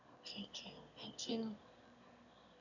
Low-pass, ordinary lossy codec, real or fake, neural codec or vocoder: 7.2 kHz; none; fake; autoencoder, 22.05 kHz, a latent of 192 numbers a frame, VITS, trained on one speaker